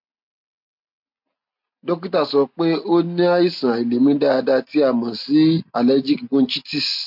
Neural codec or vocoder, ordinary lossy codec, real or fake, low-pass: none; MP3, 48 kbps; real; 5.4 kHz